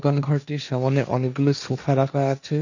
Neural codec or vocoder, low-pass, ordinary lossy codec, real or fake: codec, 16 kHz, 1.1 kbps, Voila-Tokenizer; 7.2 kHz; none; fake